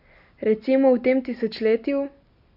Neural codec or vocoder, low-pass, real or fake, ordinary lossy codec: none; 5.4 kHz; real; none